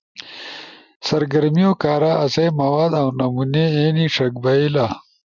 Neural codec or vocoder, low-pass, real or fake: none; 7.2 kHz; real